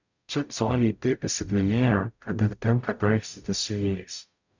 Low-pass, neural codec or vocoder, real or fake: 7.2 kHz; codec, 44.1 kHz, 0.9 kbps, DAC; fake